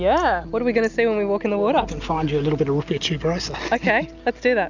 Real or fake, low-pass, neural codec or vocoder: real; 7.2 kHz; none